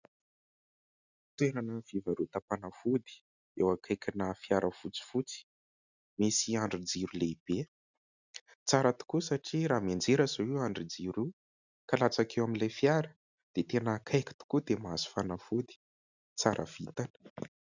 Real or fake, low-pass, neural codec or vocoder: real; 7.2 kHz; none